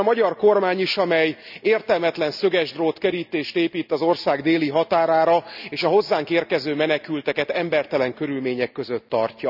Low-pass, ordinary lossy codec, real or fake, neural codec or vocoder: 5.4 kHz; none; real; none